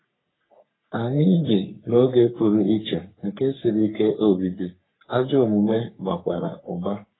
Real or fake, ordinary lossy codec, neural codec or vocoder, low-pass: fake; AAC, 16 kbps; codec, 44.1 kHz, 3.4 kbps, Pupu-Codec; 7.2 kHz